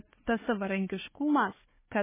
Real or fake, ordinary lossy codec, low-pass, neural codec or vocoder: fake; MP3, 16 kbps; 3.6 kHz; codec, 16 kHz, 4 kbps, FunCodec, trained on Chinese and English, 50 frames a second